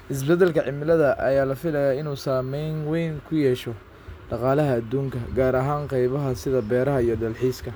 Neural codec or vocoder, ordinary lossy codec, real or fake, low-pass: none; none; real; none